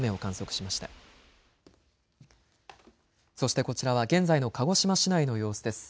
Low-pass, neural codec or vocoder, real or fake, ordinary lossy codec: none; none; real; none